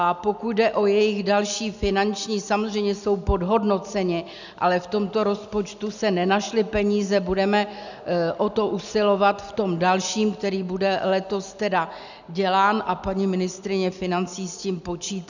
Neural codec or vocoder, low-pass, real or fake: none; 7.2 kHz; real